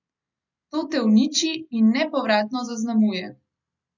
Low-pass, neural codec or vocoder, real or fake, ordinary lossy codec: 7.2 kHz; none; real; none